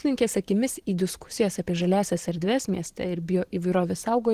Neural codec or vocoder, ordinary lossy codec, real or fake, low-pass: vocoder, 44.1 kHz, 128 mel bands every 512 samples, BigVGAN v2; Opus, 16 kbps; fake; 14.4 kHz